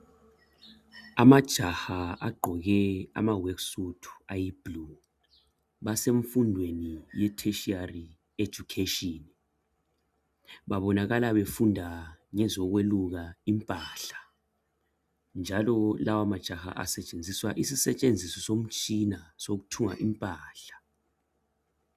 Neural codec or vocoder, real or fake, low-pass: none; real; 14.4 kHz